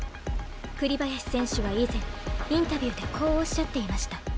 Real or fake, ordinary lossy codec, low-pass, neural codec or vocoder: real; none; none; none